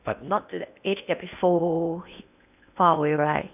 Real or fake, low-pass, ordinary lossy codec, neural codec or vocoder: fake; 3.6 kHz; none; codec, 16 kHz in and 24 kHz out, 0.8 kbps, FocalCodec, streaming, 65536 codes